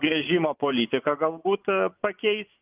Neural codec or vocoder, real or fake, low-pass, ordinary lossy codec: vocoder, 24 kHz, 100 mel bands, Vocos; fake; 3.6 kHz; Opus, 24 kbps